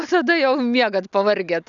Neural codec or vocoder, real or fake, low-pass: none; real; 7.2 kHz